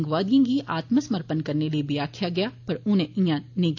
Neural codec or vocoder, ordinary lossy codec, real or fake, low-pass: none; AAC, 48 kbps; real; 7.2 kHz